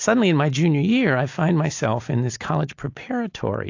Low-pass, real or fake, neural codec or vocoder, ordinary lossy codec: 7.2 kHz; real; none; AAC, 48 kbps